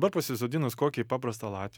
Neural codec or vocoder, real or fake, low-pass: none; real; 19.8 kHz